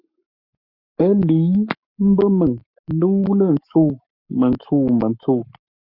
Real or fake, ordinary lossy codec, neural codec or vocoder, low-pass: fake; Opus, 64 kbps; codec, 44.1 kHz, 7.8 kbps, Pupu-Codec; 5.4 kHz